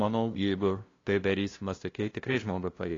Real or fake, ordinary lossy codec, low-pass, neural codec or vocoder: fake; AAC, 32 kbps; 7.2 kHz; codec, 16 kHz, 0.5 kbps, FunCodec, trained on LibriTTS, 25 frames a second